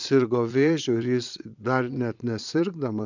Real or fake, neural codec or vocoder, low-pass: fake; vocoder, 22.05 kHz, 80 mel bands, Vocos; 7.2 kHz